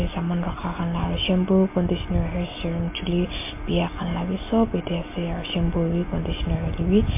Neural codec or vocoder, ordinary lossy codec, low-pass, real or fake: none; MP3, 24 kbps; 3.6 kHz; real